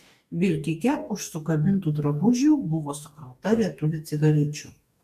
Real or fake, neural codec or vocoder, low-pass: fake; codec, 44.1 kHz, 2.6 kbps, DAC; 14.4 kHz